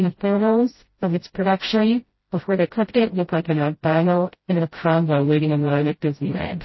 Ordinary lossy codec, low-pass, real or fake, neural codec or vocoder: MP3, 24 kbps; 7.2 kHz; fake; codec, 16 kHz, 0.5 kbps, FreqCodec, smaller model